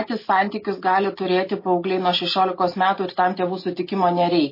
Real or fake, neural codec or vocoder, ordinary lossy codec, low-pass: real; none; MP3, 24 kbps; 5.4 kHz